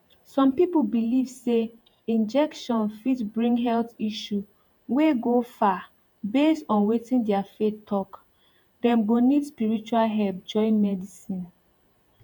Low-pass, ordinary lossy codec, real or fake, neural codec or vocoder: 19.8 kHz; none; fake; vocoder, 48 kHz, 128 mel bands, Vocos